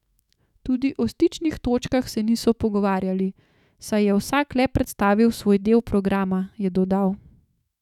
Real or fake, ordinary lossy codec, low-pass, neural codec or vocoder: fake; none; 19.8 kHz; autoencoder, 48 kHz, 128 numbers a frame, DAC-VAE, trained on Japanese speech